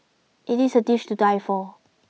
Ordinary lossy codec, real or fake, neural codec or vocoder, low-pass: none; real; none; none